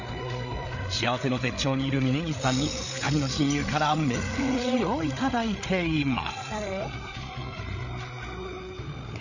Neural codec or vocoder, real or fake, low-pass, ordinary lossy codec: codec, 16 kHz, 8 kbps, FreqCodec, larger model; fake; 7.2 kHz; none